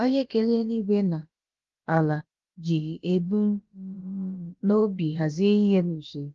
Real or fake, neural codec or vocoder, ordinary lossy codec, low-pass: fake; codec, 16 kHz, about 1 kbps, DyCAST, with the encoder's durations; Opus, 32 kbps; 7.2 kHz